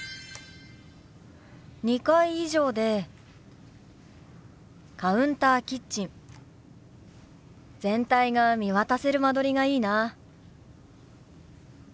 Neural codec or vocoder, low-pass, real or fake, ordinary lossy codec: none; none; real; none